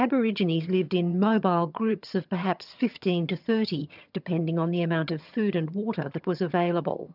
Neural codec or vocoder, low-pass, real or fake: vocoder, 22.05 kHz, 80 mel bands, HiFi-GAN; 5.4 kHz; fake